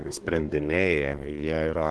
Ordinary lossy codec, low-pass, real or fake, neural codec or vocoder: Opus, 16 kbps; 10.8 kHz; fake; codec, 24 kHz, 1 kbps, SNAC